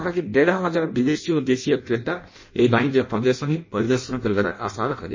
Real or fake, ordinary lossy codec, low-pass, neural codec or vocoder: fake; MP3, 32 kbps; 7.2 kHz; codec, 16 kHz in and 24 kHz out, 0.6 kbps, FireRedTTS-2 codec